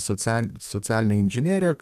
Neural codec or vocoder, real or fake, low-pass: codec, 32 kHz, 1.9 kbps, SNAC; fake; 14.4 kHz